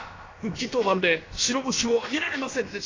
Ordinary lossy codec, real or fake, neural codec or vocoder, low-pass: AAC, 32 kbps; fake; codec, 16 kHz, about 1 kbps, DyCAST, with the encoder's durations; 7.2 kHz